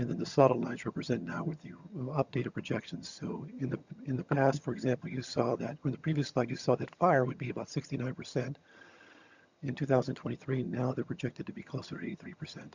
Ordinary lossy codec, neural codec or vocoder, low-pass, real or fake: Opus, 64 kbps; vocoder, 22.05 kHz, 80 mel bands, HiFi-GAN; 7.2 kHz; fake